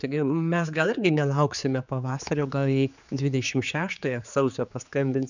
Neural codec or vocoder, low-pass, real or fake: codec, 16 kHz, 4 kbps, X-Codec, HuBERT features, trained on general audio; 7.2 kHz; fake